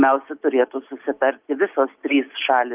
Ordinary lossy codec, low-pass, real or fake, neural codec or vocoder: Opus, 32 kbps; 3.6 kHz; real; none